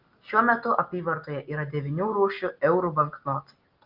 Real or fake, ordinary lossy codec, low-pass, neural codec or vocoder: real; Opus, 32 kbps; 5.4 kHz; none